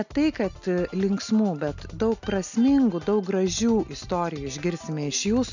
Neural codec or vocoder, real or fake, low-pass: none; real; 7.2 kHz